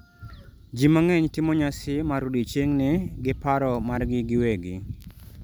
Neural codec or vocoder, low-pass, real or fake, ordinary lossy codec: none; none; real; none